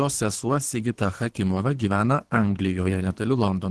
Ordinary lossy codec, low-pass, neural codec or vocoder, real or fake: Opus, 16 kbps; 10.8 kHz; codec, 24 kHz, 3 kbps, HILCodec; fake